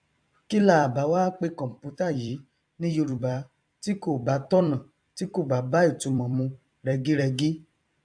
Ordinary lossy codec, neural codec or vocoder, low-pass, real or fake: AAC, 64 kbps; vocoder, 44.1 kHz, 128 mel bands every 256 samples, BigVGAN v2; 9.9 kHz; fake